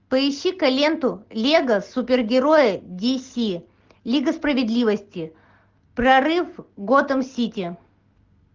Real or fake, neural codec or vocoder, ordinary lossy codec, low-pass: real; none; Opus, 32 kbps; 7.2 kHz